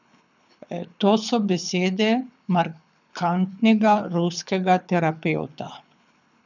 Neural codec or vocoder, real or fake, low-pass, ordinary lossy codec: codec, 24 kHz, 6 kbps, HILCodec; fake; 7.2 kHz; none